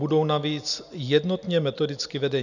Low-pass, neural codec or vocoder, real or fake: 7.2 kHz; none; real